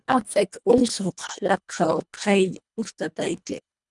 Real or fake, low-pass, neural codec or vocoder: fake; 10.8 kHz; codec, 24 kHz, 1.5 kbps, HILCodec